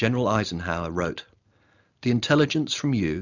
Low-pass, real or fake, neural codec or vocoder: 7.2 kHz; real; none